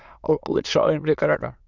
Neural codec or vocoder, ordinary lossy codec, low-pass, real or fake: autoencoder, 22.05 kHz, a latent of 192 numbers a frame, VITS, trained on many speakers; Opus, 64 kbps; 7.2 kHz; fake